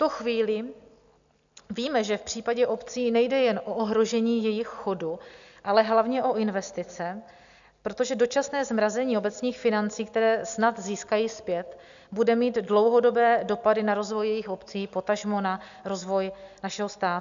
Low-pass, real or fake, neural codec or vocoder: 7.2 kHz; real; none